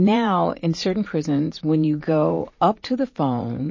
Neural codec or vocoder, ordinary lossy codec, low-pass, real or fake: vocoder, 44.1 kHz, 80 mel bands, Vocos; MP3, 32 kbps; 7.2 kHz; fake